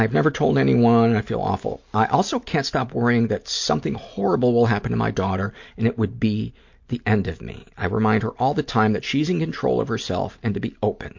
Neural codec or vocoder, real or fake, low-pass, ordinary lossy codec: none; real; 7.2 kHz; MP3, 48 kbps